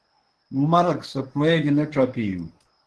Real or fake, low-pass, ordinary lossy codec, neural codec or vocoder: fake; 10.8 kHz; Opus, 16 kbps; codec, 24 kHz, 0.9 kbps, WavTokenizer, medium speech release version 1